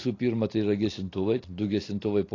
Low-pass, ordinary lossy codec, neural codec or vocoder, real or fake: 7.2 kHz; AAC, 32 kbps; none; real